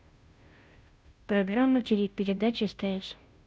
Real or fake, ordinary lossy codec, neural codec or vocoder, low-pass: fake; none; codec, 16 kHz, 0.5 kbps, FunCodec, trained on Chinese and English, 25 frames a second; none